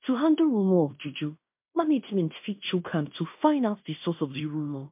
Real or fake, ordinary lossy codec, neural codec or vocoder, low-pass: fake; MP3, 32 kbps; codec, 16 kHz in and 24 kHz out, 0.9 kbps, LongCat-Audio-Codec, fine tuned four codebook decoder; 3.6 kHz